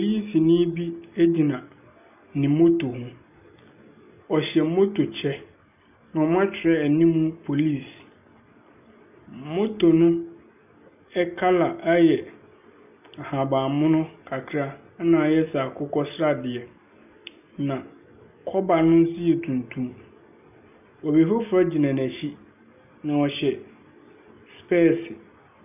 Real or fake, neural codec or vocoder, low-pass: real; none; 3.6 kHz